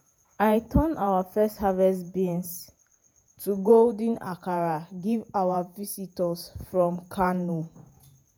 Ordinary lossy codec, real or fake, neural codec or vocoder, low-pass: none; fake; vocoder, 48 kHz, 128 mel bands, Vocos; none